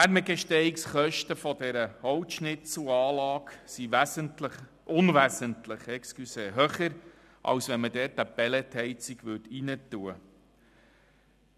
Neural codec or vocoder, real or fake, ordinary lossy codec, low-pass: none; real; none; 14.4 kHz